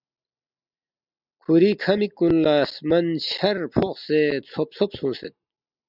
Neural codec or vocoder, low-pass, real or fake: none; 5.4 kHz; real